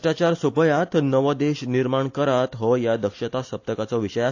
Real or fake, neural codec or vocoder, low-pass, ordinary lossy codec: real; none; 7.2 kHz; AAC, 48 kbps